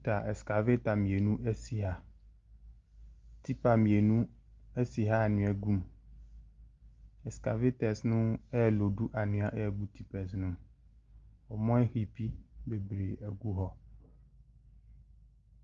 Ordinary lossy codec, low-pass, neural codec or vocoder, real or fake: Opus, 32 kbps; 7.2 kHz; none; real